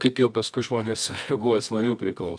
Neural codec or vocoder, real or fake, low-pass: codec, 24 kHz, 0.9 kbps, WavTokenizer, medium music audio release; fake; 9.9 kHz